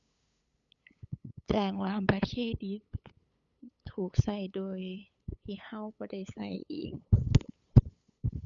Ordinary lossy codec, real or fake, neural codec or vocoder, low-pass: none; fake; codec, 16 kHz, 8 kbps, FunCodec, trained on LibriTTS, 25 frames a second; 7.2 kHz